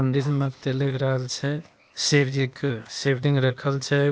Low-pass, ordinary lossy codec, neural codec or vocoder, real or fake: none; none; codec, 16 kHz, 0.8 kbps, ZipCodec; fake